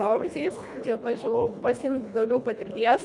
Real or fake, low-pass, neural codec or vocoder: fake; 10.8 kHz; codec, 24 kHz, 1.5 kbps, HILCodec